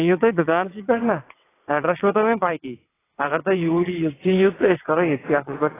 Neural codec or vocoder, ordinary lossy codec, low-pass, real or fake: vocoder, 22.05 kHz, 80 mel bands, WaveNeXt; AAC, 16 kbps; 3.6 kHz; fake